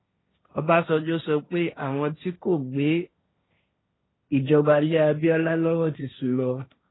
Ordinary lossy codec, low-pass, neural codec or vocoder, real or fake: AAC, 16 kbps; 7.2 kHz; codec, 16 kHz, 1.1 kbps, Voila-Tokenizer; fake